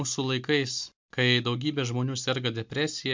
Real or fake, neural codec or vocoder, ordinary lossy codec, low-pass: real; none; MP3, 64 kbps; 7.2 kHz